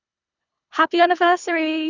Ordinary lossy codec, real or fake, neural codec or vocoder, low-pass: none; fake; codec, 24 kHz, 3 kbps, HILCodec; 7.2 kHz